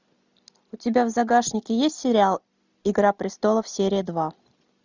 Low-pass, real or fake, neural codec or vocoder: 7.2 kHz; real; none